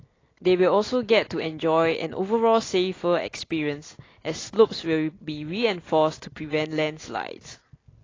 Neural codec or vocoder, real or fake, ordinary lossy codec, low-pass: none; real; AAC, 32 kbps; 7.2 kHz